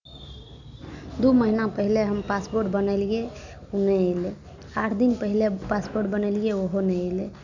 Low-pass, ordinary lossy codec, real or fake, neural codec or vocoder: 7.2 kHz; none; real; none